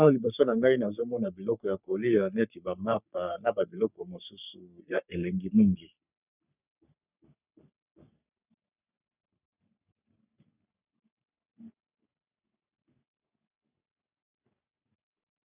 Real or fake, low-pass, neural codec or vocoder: fake; 3.6 kHz; codec, 44.1 kHz, 3.4 kbps, Pupu-Codec